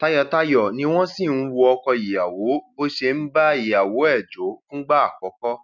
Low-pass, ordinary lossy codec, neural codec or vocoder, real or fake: 7.2 kHz; none; none; real